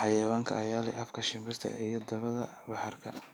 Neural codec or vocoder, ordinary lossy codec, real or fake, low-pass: codec, 44.1 kHz, 7.8 kbps, DAC; none; fake; none